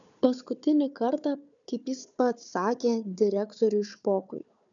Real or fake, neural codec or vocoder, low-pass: fake; codec, 16 kHz, 4 kbps, FunCodec, trained on Chinese and English, 50 frames a second; 7.2 kHz